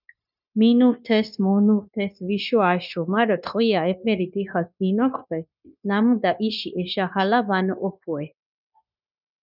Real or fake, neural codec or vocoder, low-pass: fake; codec, 16 kHz, 0.9 kbps, LongCat-Audio-Codec; 5.4 kHz